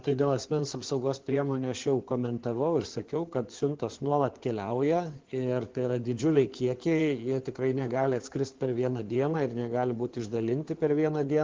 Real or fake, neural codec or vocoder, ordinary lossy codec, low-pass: fake; codec, 16 kHz in and 24 kHz out, 2.2 kbps, FireRedTTS-2 codec; Opus, 16 kbps; 7.2 kHz